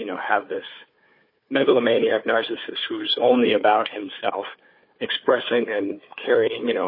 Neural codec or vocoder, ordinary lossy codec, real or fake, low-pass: codec, 16 kHz, 4 kbps, FunCodec, trained on Chinese and English, 50 frames a second; MP3, 24 kbps; fake; 5.4 kHz